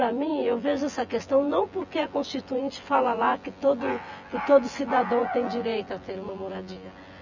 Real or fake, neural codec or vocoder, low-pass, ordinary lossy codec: fake; vocoder, 24 kHz, 100 mel bands, Vocos; 7.2 kHz; none